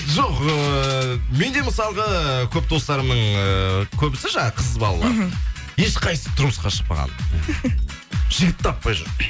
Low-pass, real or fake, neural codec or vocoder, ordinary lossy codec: none; real; none; none